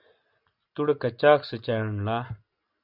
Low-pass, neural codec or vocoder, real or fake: 5.4 kHz; none; real